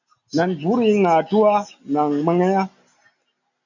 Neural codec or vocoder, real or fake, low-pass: none; real; 7.2 kHz